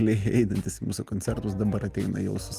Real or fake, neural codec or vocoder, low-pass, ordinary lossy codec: real; none; 14.4 kHz; Opus, 32 kbps